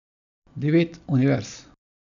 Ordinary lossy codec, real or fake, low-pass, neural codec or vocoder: none; real; 7.2 kHz; none